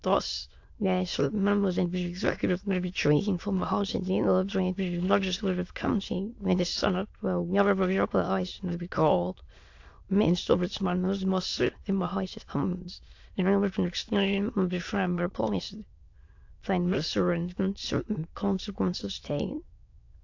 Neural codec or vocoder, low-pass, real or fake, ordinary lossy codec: autoencoder, 22.05 kHz, a latent of 192 numbers a frame, VITS, trained on many speakers; 7.2 kHz; fake; AAC, 48 kbps